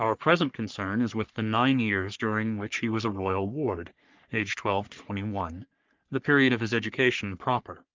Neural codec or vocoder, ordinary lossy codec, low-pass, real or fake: codec, 44.1 kHz, 3.4 kbps, Pupu-Codec; Opus, 32 kbps; 7.2 kHz; fake